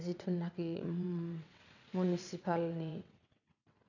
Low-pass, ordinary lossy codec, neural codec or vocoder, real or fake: 7.2 kHz; none; vocoder, 22.05 kHz, 80 mel bands, WaveNeXt; fake